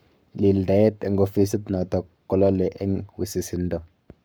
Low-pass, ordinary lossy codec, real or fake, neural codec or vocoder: none; none; fake; codec, 44.1 kHz, 7.8 kbps, Pupu-Codec